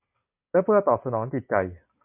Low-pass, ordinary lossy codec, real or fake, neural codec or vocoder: 3.6 kHz; MP3, 32 kbps; real; none